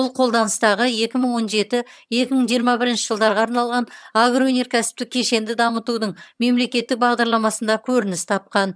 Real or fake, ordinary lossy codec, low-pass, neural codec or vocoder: fake; none; none; vocoder, 22.05 kHz, 80 mel bands, HiFi-GAN